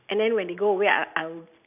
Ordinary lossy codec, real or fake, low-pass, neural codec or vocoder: none; real; 3.6 kHz; none